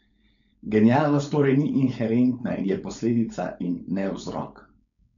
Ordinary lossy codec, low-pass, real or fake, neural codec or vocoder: none; 7.2 kHz; fake; codec, 16 kHz, 4.8 kbps, FACodec